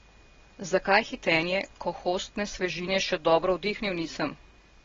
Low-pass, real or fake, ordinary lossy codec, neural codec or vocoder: 7.2 kHz; real; AAC, 24 kbps; none